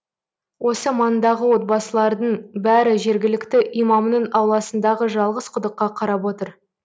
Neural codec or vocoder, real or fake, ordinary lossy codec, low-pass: none; real; none; none